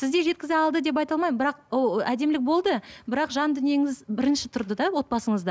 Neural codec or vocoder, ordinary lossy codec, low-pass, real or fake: none; none; none; real